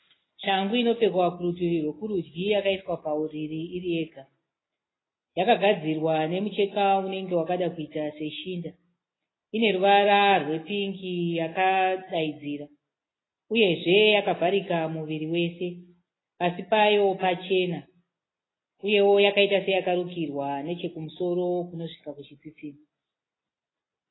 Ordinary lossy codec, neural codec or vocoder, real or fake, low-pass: AAC, 16 kbps; none; real; 7.2 kHz